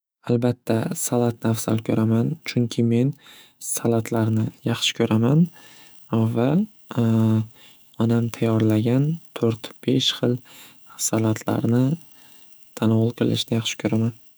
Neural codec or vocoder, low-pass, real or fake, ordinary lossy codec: autoencoder, 48 kHz, 128 numbers a frame, DAC-VAE, trained on Japanese speech; none; fake; none